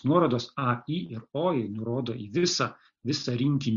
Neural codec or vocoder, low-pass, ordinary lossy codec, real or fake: none; 7.2 kHz; Opus, 64 kbps; real